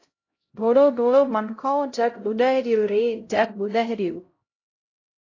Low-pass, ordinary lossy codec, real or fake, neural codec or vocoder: 7.2 kHz; AAC, 32 kbps; fake; codec, 16 kHz, 0.5 kbps, X-Codec, HuBERT features, trained on LibriSpeech